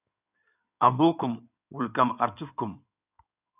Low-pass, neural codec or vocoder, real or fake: 3.6 kHz; codec, 16 kHz in and 24 kHz out, 2.2 kbps, FireRedTTS-2 codec; fake